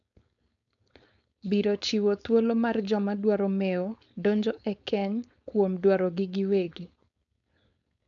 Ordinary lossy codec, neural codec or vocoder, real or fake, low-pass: none; codec, 16 kHz, 4.8 kbps, FACodec; fake; 7.2 kHz